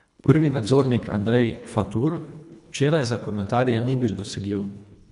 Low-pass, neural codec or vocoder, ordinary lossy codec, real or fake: 10.8 kHz; codec, 24 kHz, 1.5 kbps, HILCodec; none; fake